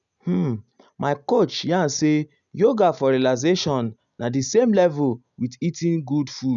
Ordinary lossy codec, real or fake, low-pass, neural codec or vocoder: none; real; 7.2 kHz; none